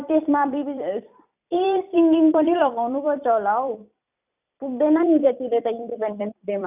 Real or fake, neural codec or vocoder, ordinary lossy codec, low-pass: fake; vocoder, 44.1 kHz, 128 mel bands every 256 samples, BigVGAN v2; none; 3.6 kHz